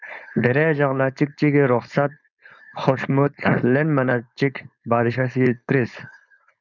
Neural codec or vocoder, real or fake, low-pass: codec, 16 kHz, 4.8 kbps, FACodec; fake; 7.2 kHz